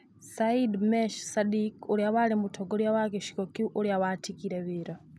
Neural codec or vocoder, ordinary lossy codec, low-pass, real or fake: none; none; none; real